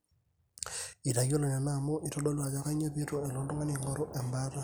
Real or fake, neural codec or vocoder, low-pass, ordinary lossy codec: real; none; none; none